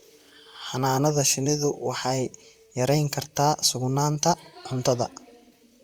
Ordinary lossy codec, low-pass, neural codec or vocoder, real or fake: Opus, 64 kbps; 19.8 kHz; vocoder, 44.1 kHz, 128 mel bands, Pupu-Vocoder; fake